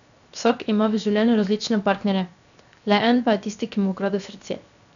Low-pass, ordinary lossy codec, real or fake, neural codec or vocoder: 7.2 kHz; none; fake; codec, 16 kHz, 0.7 kbps, FocalCodec